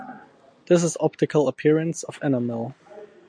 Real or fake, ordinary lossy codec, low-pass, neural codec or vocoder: real; MP3, 64 kbps; 9.9 kHz; none